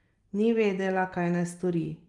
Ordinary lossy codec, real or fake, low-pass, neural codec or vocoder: Opus, 32 kbps; real; 10.8 kHz; none